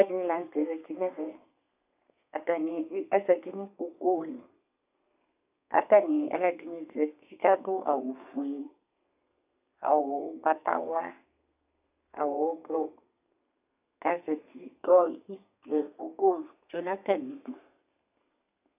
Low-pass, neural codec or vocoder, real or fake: 3.6 kHz; codec, 32 kHz, 1.9 kbps, SNAC; fake